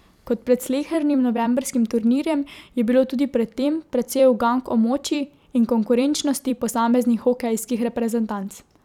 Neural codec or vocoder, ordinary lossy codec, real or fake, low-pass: vocoder, 44.1 kHz, 128 mel bands every 256 samples, BigVGAN v2; none; fake; 19.8 kHz